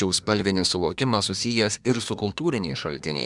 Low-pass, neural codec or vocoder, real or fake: 10.8 kHz; codec, 24 kHz, 1 kbps, SNAC; fake